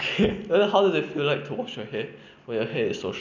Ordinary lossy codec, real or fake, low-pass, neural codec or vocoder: none; real; 7.2 kHz; none